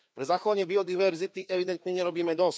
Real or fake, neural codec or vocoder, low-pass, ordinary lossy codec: fake; codec, 16 kHz, 2 kbps, FreqCodec, larger model; none; none